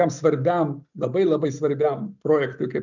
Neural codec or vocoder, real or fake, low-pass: none; real; 7.2 kHz